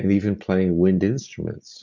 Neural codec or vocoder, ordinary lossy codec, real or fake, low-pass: none; MP3, 64 kbps; real; 7.2 kHz